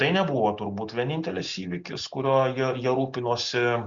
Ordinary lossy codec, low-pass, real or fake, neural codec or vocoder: Opus, 64 kbps; 7.2 kHz; real; none